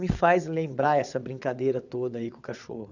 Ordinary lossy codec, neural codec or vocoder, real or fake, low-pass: none; vocoder, 22.05 kHz, 80 mel bands, WaveNeXt; fake; 7.2 kHz